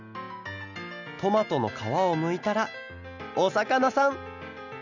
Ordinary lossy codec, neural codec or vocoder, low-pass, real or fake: none; none; 7.2 kHz; real